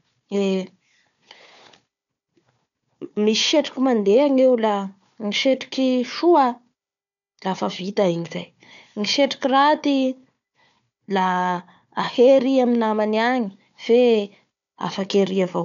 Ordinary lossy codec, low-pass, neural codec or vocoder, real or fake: none; 7.2 kHz; codec, 16 kHz, 4 kbps, FunCodec, trained on Chinese and English, 50 frames a second; fake